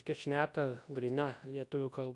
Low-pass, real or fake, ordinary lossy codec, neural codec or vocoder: 10.8 kHz; fake; AAC, 48 kbps; codec, 24 kHz, 0.9 kbps, WavTokenizer, large speech release